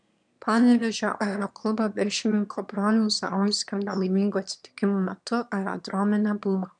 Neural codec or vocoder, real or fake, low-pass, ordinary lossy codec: autoencoder, 22.05 kHz, a latent of 192 numbers a frame, VITS, trained on one speaker; fake; 9.9 kHz; MP3, 96 kbps